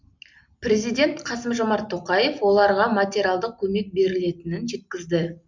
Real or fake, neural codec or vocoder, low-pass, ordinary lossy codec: real; none; 7.2 kHz; MP3, 64 kbps